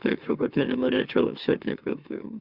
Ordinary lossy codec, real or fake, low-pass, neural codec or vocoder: Opus, 64 kbps; fake; 5.4 kHz; autoencoder, 44.1 kHz, a latent of 192 numbers a frame, MeloTTS